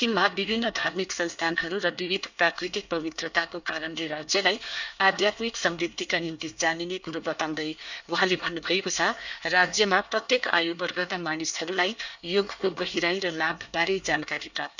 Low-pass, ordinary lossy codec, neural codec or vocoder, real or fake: 7.2 kHz; none; codec, 24 kHz, 1 kbps, SNAC; fake